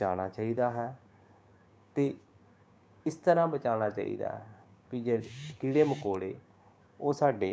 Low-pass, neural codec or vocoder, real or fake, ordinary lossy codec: none; codec, 16 kHz, 6 kbps, DAC; fake; none